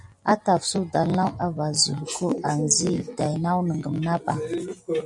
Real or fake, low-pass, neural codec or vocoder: real; 10.8 kHz; none